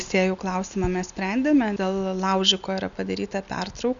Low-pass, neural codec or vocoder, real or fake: 7.2 kHz; none; real